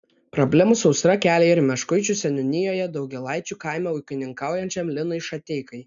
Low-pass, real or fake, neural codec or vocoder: 7.2 kHz; real; none